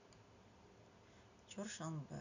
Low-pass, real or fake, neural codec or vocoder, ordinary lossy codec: 7.2 kHz; real; none; none